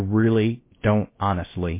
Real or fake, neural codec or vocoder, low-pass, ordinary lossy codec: fake; codec, 16 kHz, about 1 kbps, DyCAST, with the encoder's durations; 3.6 kHz; MP3, 24 kbps